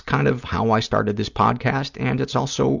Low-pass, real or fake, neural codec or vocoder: 7.2 kHz; real; none